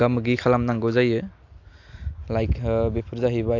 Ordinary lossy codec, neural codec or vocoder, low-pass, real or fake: AAC, 48 kbps; none; 7.2 kHz; real